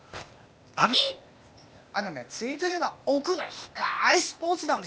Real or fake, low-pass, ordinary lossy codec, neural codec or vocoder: fake; none; none; codec, 16 kHz, 0.8 kbps, ZipCodec